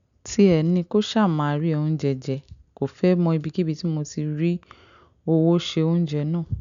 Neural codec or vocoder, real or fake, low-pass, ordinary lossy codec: none; real; 7.2 kHz; none